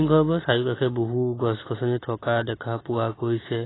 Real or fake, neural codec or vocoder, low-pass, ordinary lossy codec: real; none; 7.2 kHz; AAC, 16 kbps